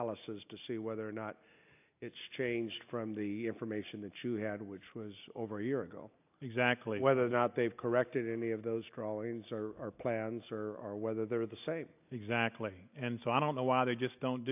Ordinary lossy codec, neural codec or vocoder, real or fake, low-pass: AAC, 32 kbps; none; real; 3.6 kHz